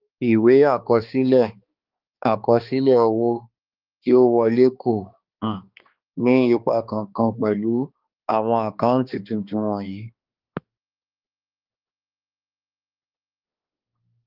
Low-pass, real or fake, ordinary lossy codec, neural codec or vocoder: 5.4 kHz; fake; Opus, 32 kbps; codec, 16 kHz, 2 kbps, X-Codec, HuBERT features, trained on balanced general audio